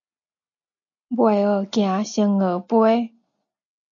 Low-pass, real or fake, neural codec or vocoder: 7.2 kHz; real; none